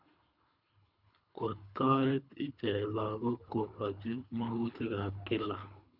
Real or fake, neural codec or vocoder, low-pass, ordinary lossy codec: fake; codec, 24 kHz, 3 kbps, HILCodec; 5.4 kHz; none